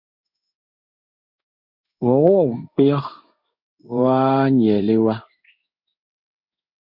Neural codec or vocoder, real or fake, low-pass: codec, 16 kHz in and 24 kHz out, 1 kbps, XY-Tokenizer; fake; 5.4 kHz